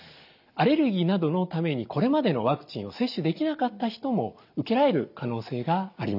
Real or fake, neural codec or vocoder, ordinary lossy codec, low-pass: real; none; none; 5.4 kHz